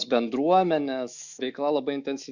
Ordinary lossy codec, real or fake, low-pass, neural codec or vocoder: Opus, 64 kbps; fake; 7.2 kHz; autoencoder, 48 kHz, 128 numbers a frame, DAC-VAE, trained on Japanese speech